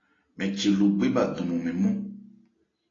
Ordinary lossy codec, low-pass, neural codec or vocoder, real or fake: AAC, 32 kbps; 7.2 kHz; none; real